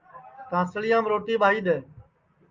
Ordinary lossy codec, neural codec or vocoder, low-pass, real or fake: Opus, 24 kbps; none; 7.2 kHz; real